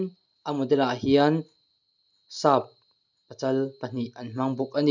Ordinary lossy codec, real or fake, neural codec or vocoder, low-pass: none; real; none; 7.2 kHz